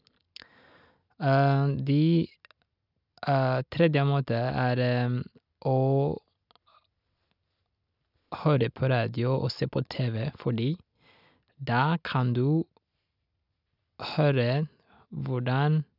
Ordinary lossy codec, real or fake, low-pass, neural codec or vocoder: none; real; 5.4 kHz; none